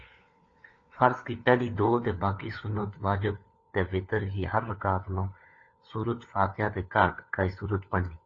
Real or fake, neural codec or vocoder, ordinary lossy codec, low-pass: fake; codec, 16 kHz, 4 kbps, FunCodec, trained on LibriTTS, 50 frames a second; AAC, 32 kbps; 7.2 kHz